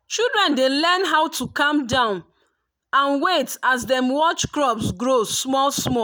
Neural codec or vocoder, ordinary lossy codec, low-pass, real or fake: none; none; none; real